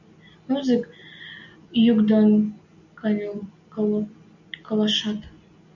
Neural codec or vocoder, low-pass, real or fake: none; 7.2 kHz; real